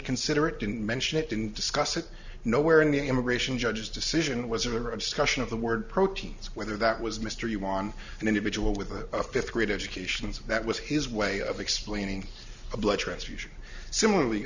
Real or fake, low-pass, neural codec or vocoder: real; 7.2 kHz; none